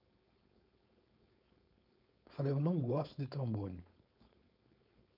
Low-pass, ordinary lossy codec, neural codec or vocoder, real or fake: 5.4 kHz; none; codec, 16 kHz, 4.8 kbps, FACodec; fake